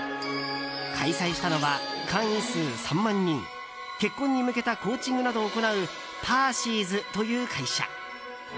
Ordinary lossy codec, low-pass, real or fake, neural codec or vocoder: none; none; real; none